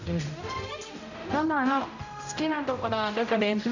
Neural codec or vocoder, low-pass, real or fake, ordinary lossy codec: codec, 16 kHz, 0.5 kbps, X-Codec, HuBERT features, trained on general audio; 7.2 kHz; fake; none